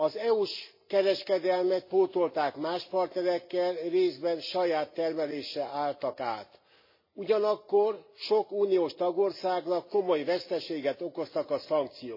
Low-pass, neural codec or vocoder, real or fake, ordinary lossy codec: 5.4 kHz; none; real; MP3, 24 kbps